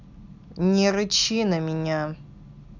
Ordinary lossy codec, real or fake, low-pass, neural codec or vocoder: none; real; 7.2 kHz; none